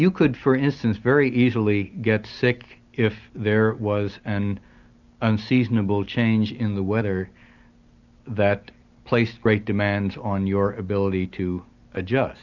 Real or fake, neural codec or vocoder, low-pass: real; none; 7.2 kHz